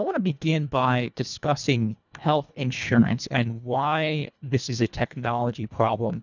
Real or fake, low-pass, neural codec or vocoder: fake; 7.2 kHz; codec, 24 kHz, 1.5 kbps, HILCodec